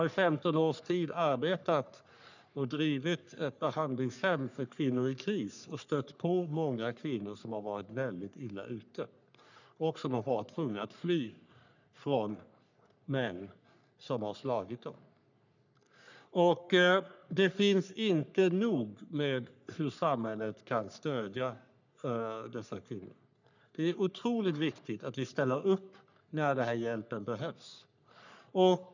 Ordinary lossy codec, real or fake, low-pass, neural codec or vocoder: none; fake; 7.2 kHz; codec, 44.1 kHz, 3.4 kbps, Pupu-Codec